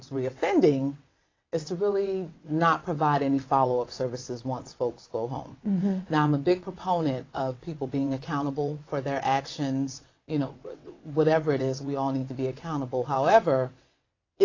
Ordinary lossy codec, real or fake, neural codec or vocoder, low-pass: AAC, 32 kbps; fake; vocoder, 22.05 kHz, 80 mel bands, WaveNeXt; 7.2 kHz